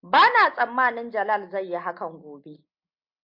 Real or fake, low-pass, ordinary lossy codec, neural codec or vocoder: real; 5.4 kHz; MP3, 48 kbps; none